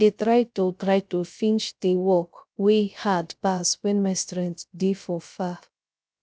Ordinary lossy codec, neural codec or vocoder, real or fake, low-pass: none; codec, 16 kHz, 0.3 kbps, FocalCodec; fake; none